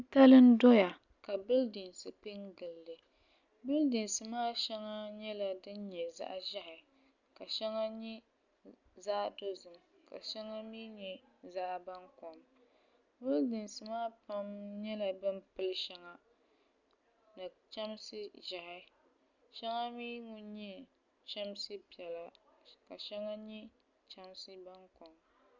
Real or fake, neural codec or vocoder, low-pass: real; none; 7.2 kHz